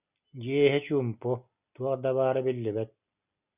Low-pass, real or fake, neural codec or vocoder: 3.6 kHz; real; none